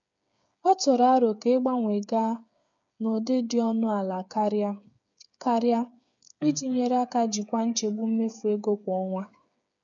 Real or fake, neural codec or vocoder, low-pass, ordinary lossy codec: fake; codec, 16 kHz, 8 kbps, FreqCodec, smaller model; 7.2 kHz; none